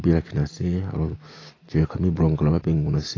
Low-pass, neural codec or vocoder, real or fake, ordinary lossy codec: 7.2 kHz; none; real; AAC, 32 kbps